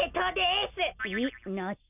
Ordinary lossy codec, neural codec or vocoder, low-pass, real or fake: none; none; 3.6 kHz; real